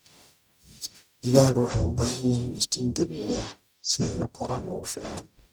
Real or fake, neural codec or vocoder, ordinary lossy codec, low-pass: fake; codec, 44.1 kHz, 0.9 kbps, DAC; none; none